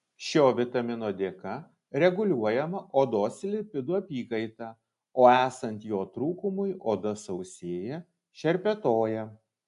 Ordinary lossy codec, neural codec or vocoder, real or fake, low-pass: AAC, 96 kbps; none; real; 10.8 kHz